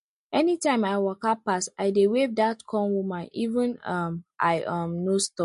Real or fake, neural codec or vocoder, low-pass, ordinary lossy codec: real; none; 10.8 kHz; MP3, 48 kbps